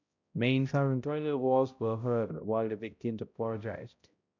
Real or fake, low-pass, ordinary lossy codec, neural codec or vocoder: fake; 7.2 kHz; none; codec, 16 kHz, 0.5 kbps, X-Codec, HuBERT features, trained on balanced general audio